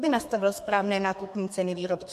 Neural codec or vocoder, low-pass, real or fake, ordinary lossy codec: codec, 32 kHz, 1.9 kbps, SNAC; 14.4 kHz; fake; MP3, 64 kbps